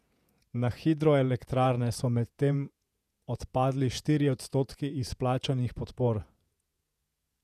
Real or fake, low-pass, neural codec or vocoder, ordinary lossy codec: fake; 14.4 kHz; vocoder, 48 kHz, 128 mel bands, Vocos; none